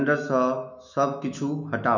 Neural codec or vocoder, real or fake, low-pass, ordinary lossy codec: autoencoder, 48 kHz, 128 numbers a frame, DAC-VAE, trained on Japanese speech; fake; 7.2 kHz; none